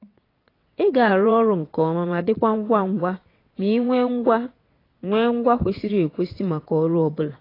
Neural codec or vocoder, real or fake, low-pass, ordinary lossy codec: vocoder, 22.05 kHz, 80 mel bands, WaveNeXt; fake; 5.4 kHz; AAC, 24 kbps